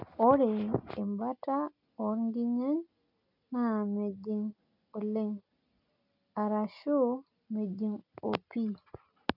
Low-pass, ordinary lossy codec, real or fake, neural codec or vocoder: 5.4 kHz; none; real; none